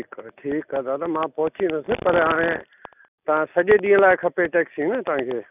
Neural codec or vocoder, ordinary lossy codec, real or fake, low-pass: none; none; real; 3.6 kHz